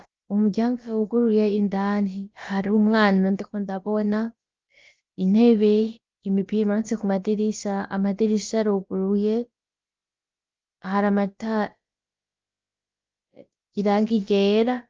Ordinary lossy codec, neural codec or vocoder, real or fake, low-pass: Opus, 24 kbps; codec, 16 kHz, about 1 kbps, DyCAST, with the encoder's durations; fake; 7.2 kHz